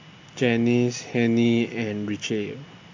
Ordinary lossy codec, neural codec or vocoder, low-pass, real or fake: AAC, 48 kbps; none; 7.2 kHz; real